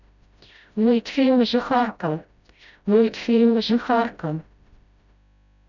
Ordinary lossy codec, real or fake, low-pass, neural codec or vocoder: none; fake; 7.2 kHz; codec, 16 kHz, 0.5 kbps, FreqCodec, smaller model